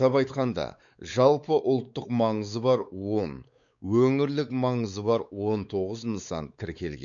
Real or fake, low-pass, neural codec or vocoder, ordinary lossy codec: fake; 7.2 kHz; codec, 16 kHz, 4 kbps, X-Codec, WavLM features, trained on Multilingual LibriSpeech; none